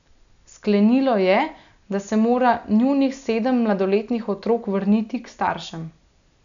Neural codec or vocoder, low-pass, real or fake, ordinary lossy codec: none; 7.2 kHz; real; none